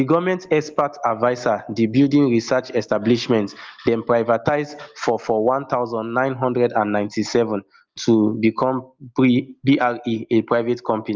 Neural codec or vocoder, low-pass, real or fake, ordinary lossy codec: none; 7.2 kHz; real; Opus, 32 kbps